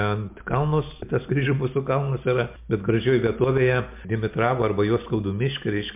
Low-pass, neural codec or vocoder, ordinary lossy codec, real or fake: 3.6 kHz; none; MP3, 32 kbps; real